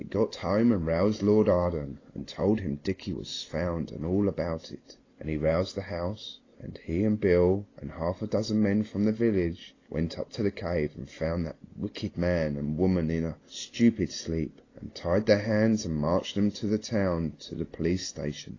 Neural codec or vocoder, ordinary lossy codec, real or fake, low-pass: none; AAC, 32 kbps; real; 7.2 kHz